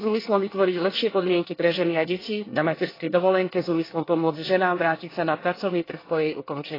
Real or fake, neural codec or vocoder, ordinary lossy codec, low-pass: fake; codec, 24 kHz, 1 kbps, SNAC; AAC, 24 kbps; 5.4 kHz